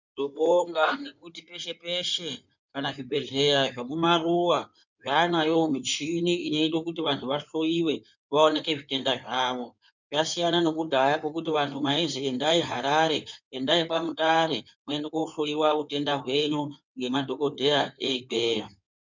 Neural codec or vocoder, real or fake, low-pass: codec, 16 kHz in and 24 kHz out, 2.2 kbps, FireRedTTS-2 codec; fake; 7.2 kHz